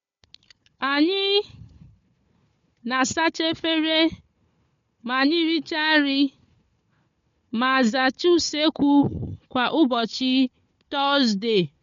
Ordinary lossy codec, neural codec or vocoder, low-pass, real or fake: MP3, 48 kbps; codec, 16 kHz, 4 kbps, FunCodec, trained on Chinese and English, 50 frames a second; 7.2 kHz; fake